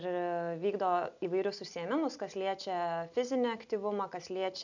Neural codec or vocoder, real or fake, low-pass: none; real; 7.2 kHz